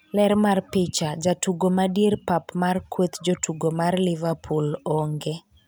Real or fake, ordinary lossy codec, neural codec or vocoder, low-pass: real; none; none; none